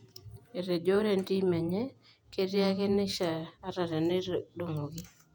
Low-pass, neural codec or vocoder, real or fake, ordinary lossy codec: 19.8 kHz; vocoder, 48 kHz, 128 mel bands, Vocos; fake; none